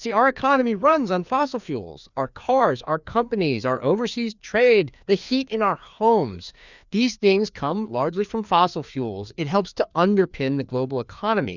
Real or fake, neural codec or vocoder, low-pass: fake; codec, 16 kHz, 2 kbps, FreqCodec, larger model; 7.2 kHz